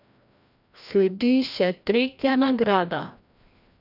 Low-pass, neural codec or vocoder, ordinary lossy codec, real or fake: 5.4 kHz; codec, 16 kHz, 1 kbps, FreqCodec, larger model; none; fake